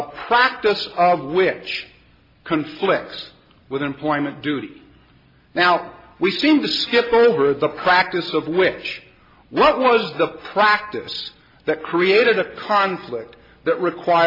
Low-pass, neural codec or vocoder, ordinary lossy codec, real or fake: 5.4 kHz; none; MP3, 32 kbps; real